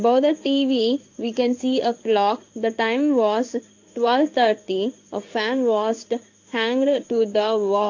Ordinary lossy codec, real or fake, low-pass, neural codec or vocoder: AAC, 48 kbps; fake; 7.2 kHz; codec, 16 kHz in and 24 kHz out, 1 kbps, XY-Tokenizer